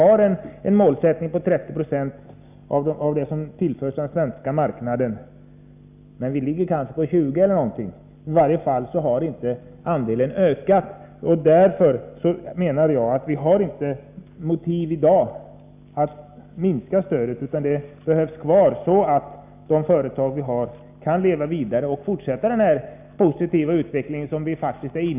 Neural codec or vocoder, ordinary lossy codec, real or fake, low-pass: none; none; real; 3.6 kHz